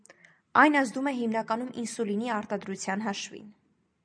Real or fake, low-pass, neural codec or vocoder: real; 9.9 kHz; none